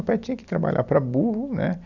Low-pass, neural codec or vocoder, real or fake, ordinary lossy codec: 7.2 kHz; none; real; none